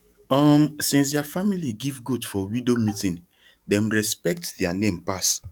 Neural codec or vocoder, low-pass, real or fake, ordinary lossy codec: codec, 44.1 kHz, 7.8 kbps, DAC; 19.8 kHz; fake; none